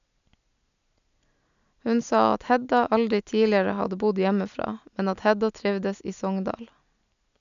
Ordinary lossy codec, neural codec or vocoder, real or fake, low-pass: none; none; real; 7.2 kHz